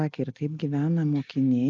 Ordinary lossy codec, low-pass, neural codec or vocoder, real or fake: Opus, 32 kbps; 7.2 kHz; none; real